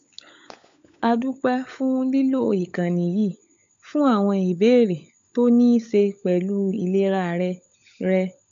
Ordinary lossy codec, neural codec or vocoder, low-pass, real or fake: AAC, 64 kbps; codec, 16 kHz, 16 kbps, FunCodec, trained on LibriTTS, 50 frames a second; 7.2 kHz; fake